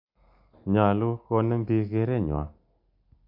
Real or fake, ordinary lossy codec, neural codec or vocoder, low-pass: real; AAC, 48 kbps; none; 5.4 kHz